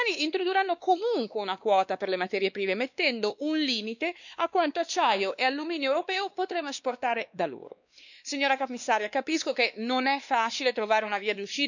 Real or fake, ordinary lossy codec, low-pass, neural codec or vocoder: fake; none; 7.2 kHz; codec, 16 kHz, 2 kbps, X-Codec, WavLM features, trained on Multilingual LibriSpeech